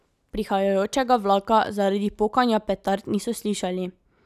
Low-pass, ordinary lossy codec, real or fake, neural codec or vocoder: 14.4 kHz; none; real; none